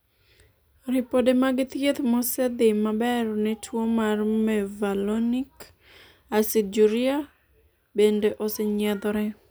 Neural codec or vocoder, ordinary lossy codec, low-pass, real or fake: none; none; none; real